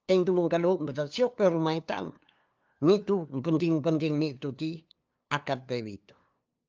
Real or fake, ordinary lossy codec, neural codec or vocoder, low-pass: fake; Opus, 24 kbps; codec, 16 kHz, 2 kbps, FunCodec, trained on LibriTTS, 25 frames a second; 7.2 kHz